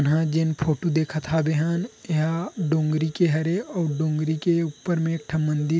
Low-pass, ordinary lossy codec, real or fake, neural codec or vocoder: none; none; real; none